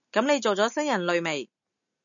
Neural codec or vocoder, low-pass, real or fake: none; 7.2 kHz; real